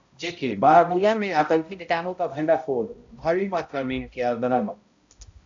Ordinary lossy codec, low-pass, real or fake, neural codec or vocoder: AAC, 64 kbps; 7.2 kHz; fake; codec, 16 kHz, 0.5 kbps, X-Codec, HuBERT features, trained on balanced general audio